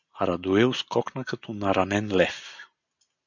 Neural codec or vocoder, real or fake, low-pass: none; real; 7.2 kHz